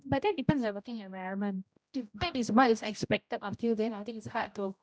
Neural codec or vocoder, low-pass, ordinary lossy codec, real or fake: codec, 16 kHz, 0.5 kbps, X-Codec, HuBERT features, trained on general audio; none; none; fake